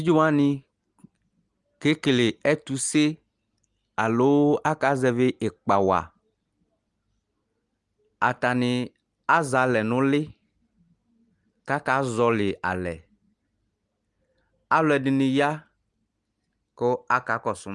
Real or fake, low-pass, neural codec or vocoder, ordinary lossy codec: real; 10.8 kHz; none; Opus, 32 kbps